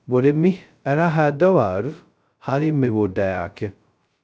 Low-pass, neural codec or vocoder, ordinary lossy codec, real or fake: none; codec, 16 kHz, 0.2 kbps, FocalCodec; none; fake